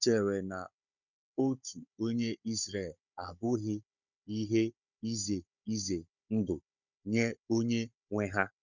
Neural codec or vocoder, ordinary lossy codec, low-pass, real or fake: codec, 16 kHz, 16 kbps, FunCodec, trained on Chinese and English, 50 frames a second; none; 7.2 kHz; fake